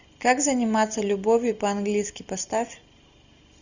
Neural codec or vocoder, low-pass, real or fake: none; 7.2 kHz; real